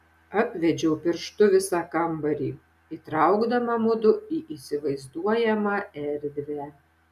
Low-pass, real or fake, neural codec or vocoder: 14.4 kHz; real; none